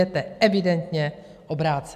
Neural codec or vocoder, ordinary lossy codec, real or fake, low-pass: none; AAC, 96 kbps; real; 14.4 kHz